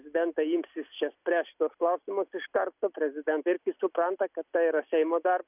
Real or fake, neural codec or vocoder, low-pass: real; none; 3.6 kHz